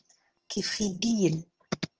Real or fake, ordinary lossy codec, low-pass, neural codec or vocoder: fake; Opus, 16 kbps; 7.2 kHz; vocoder, 22.05 kHz, 80 mel bands, HiFi-GAN